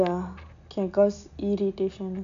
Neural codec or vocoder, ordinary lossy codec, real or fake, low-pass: none; none; real; 7.2 kHz